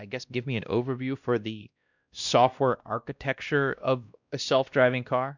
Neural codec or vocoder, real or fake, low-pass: codec, 16 kHz, 1 kbps, X-Codec, WavLM features, trained on Multilingual LibriSpeech; fake; 7.2 kHz